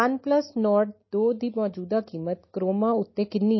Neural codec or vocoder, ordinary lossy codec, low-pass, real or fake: none; MP3, 24 kbps; 7.2 kHz; real